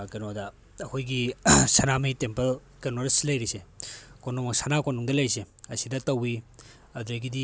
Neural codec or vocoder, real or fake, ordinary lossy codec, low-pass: none; real; none; none